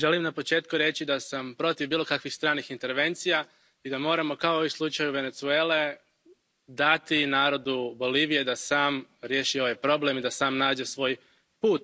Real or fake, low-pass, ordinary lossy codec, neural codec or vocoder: real; none; none; none